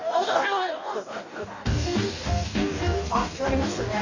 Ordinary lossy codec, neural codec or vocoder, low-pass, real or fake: none; codec, 44.1 kHz, 2.6 kbps, DAC; 7.2 kHz; fake